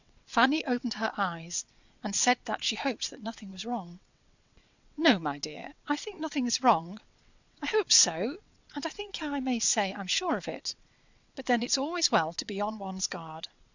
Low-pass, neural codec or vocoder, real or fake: 7.2 kHz; vocoder, 22.05 kHz, 80 mel bands, WaveNeXt; fake